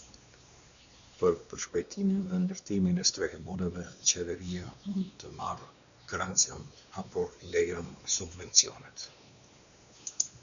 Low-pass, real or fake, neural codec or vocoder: 7.2 kHz; fake; codec, 16 kHz, 2 kbps, X-Codec, WavLM features, trained on Multilingual LibriSpeech